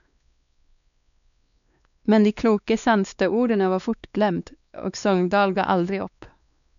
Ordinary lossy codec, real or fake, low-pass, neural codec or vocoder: none; fake; 7.2 kHz; codec, 16 kHz, 2 kbps, X-Codec, WavLM features, trained on Multilingual LibriSpeech